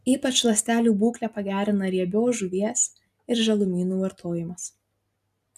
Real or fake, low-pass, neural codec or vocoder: real; 14.4 kHz; none